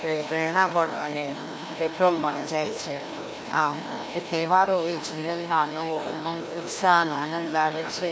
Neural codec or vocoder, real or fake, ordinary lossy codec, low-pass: codec, 16 kHz, 1 kbps, FreqCodec, larger model; fake; none; none